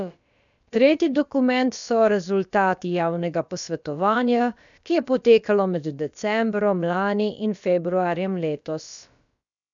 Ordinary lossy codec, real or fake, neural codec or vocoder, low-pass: none; fake; codec, 16 kHz, about 1 kbps, DyCAST, with the encoder's durations; 7.2 kHz